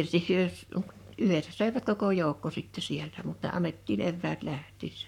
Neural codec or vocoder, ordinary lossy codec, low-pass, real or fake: codec, 44.1 kHz, 7.8 kbps, Pupu-Codec; none; 19.8 kHz; fake